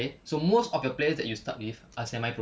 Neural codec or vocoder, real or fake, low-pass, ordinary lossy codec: none; real; none; none